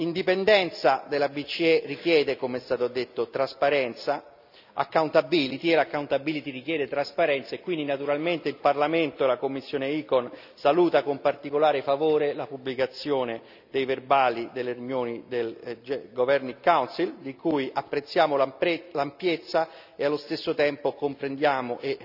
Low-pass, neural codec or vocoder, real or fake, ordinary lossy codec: 5.4 kHz; none; real; none